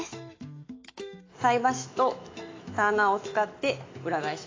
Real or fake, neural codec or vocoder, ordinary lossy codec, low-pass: fake; autoencoder, 48 kHz, 128 numbers a frame, DAC-VAE, trained on Japanese speech; AAC, 32 kbps; 7.2 kHz